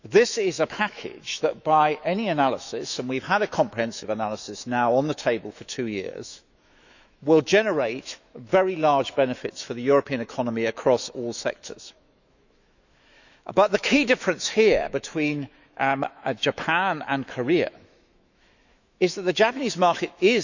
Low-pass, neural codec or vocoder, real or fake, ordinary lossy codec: 7.2 kHz; autoencoder, 48 kHz, 128 numbers a frame, DAC-VAE, trained on Japanese speech; fake; none